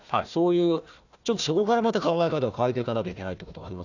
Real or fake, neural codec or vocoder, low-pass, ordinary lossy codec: fake; codec, 16 kHz, 1 kbps, FunCodec, trained on Chinese and English, 50 frames a second; 7.2 kHz; none